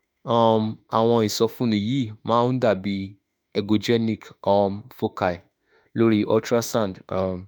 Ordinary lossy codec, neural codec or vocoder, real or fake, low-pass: none; autoencoder, 48 kHz, 32 numbers a frame, DAC-VAE, trained on Japanese speech; fake; none